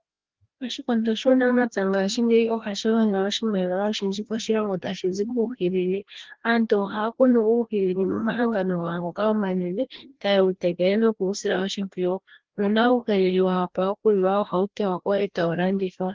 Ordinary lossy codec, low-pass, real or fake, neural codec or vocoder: Opus, 16 kbps; 7.2 kHz; fake; codec, 16 kHz, 1 kbps, FreqCodec, larger model